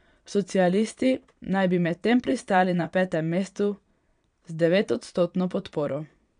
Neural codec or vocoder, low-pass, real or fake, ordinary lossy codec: vocoder, 22.05 kHz, 80 mel bands, Vocos; 9.9 kHz; fake; none